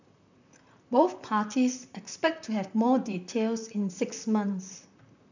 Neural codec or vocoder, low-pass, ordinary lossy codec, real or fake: vocoder, 44.1 kHz, 128 mel bands, Pupu-Vocoder; 7.2 kHz; none; fake